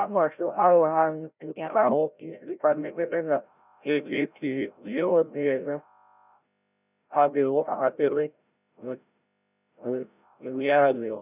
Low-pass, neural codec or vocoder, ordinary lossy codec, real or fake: 3.6 kHz; codec, 16 kHz, 0.5 kbps, FreqCodec, larger model; none; fake